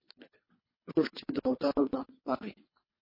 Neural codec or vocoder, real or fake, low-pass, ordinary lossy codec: codec, 24 kHz, 3 kbps, HILCodec; fake; 5.4 kHz; MP3, 24 kbps